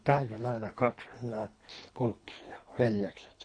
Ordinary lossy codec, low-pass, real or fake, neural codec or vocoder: MP3, 48 kbps; 9.9 kHz; fake; codec, 24 kHz, 3 kbps, HILCodec